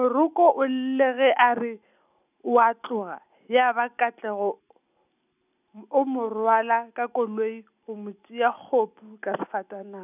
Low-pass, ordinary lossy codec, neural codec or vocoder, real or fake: 3.6 kHz; none; none; real